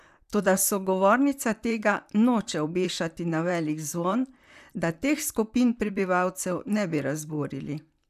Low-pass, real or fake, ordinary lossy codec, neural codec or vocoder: 14.4 kHz; fake; none; vocoder, 44.1 kHz, 128 mel bands, Pupu-Vocoder